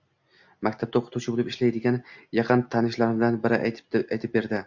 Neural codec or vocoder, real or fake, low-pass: none; real; 7.2 kHz